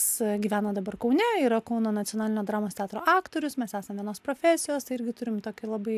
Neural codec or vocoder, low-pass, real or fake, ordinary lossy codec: none; 14.4 kHz; real; AAC, 96 kbps